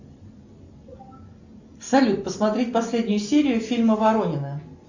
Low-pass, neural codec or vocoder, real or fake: 7.2 kHz; none; real